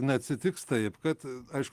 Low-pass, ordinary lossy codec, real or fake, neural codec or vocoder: 14.4 kHz; Opus, 24 kbps; real; none